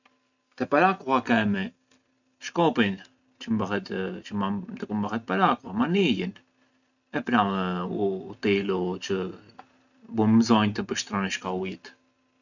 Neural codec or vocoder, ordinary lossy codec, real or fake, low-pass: none; none; real; 7.2 kHz